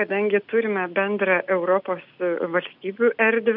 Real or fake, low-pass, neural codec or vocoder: real; 5.4 kHz; none